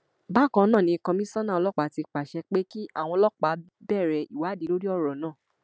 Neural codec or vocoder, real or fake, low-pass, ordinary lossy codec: none; real; none; none